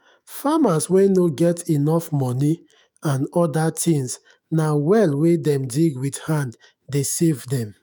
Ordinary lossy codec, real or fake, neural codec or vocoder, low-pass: none; fake; autoencoder, 48 kHz, 128 numbers a frame, DAC-VAE, trained on Japanese speech; none